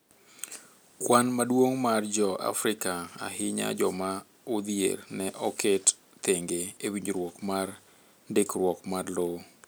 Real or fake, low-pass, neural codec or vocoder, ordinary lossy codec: real; none; none; none